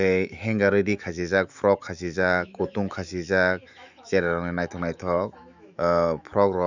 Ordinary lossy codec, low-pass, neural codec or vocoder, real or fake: none; 7.2 kHz; autoencoder, 48 kHz, 128 numbers a frame, DAC-VAE, trained on Japanese speech; fake